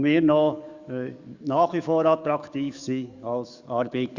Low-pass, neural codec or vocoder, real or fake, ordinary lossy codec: 7.2 kHz; codec, 44.1 kHz, 7.8 kbps, DAC; fake; Opus, 64 kbps